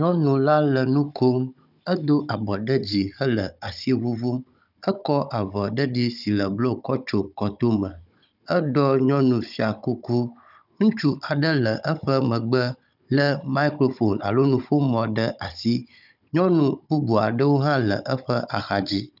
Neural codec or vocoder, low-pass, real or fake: codec, 16 kHz, 16 kbps, FunCodec, trained on Chinese and English, 50 frames a second; 5.4 kHz; fake